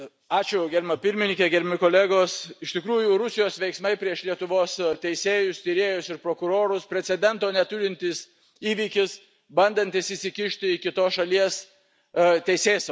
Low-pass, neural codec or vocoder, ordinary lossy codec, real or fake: none; none; none; real